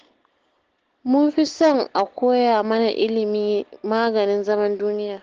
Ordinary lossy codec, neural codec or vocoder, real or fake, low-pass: Opus, 16 kbps; none; real; 7.2 kHz